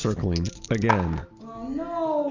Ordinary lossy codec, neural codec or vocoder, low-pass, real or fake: Opus, 64 kbps; none; 7.2 kHz; real